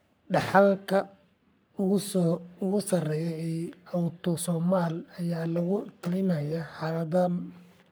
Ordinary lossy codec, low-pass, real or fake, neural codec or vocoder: none; none; fake; codec, 44.1 kHz, 3.4 kbps, Pupu-Codec